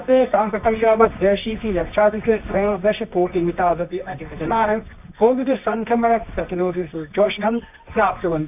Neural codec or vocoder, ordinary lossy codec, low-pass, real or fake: codec, 24 kHz, 0.9 kbps, WavTokenizer, medium music audio release; none; 3.6 kHz; fake